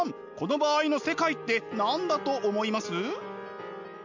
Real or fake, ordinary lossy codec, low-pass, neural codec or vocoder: real; MP3, 64 kbps; 7.2 kHz; none